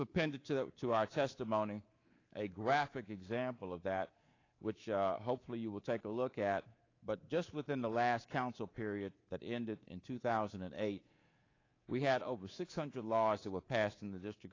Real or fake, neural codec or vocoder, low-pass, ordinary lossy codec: fake; codec, 24 kHz, 3.1 kbps, DualCodec; 7.2 kHz; AAC, 32 kbps